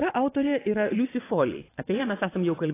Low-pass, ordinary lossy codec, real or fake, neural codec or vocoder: 3.6 kHz; AAC, 16 kbps; fake; vocoder, 22.05 kHz, 80 mel bands, WaveNeXt